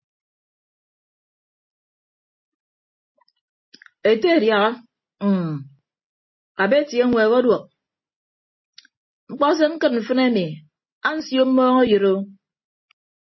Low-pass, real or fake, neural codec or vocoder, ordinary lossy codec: 7.2 kHz; real; none; MP3, 24 kbps